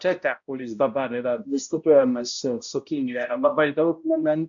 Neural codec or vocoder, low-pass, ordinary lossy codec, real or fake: codec, 16 kHz, 0.5 kbps, X-Codec, HuBERT features, trained on balanced general audio; 7.2 kHz; AAC, 64 kbps; fake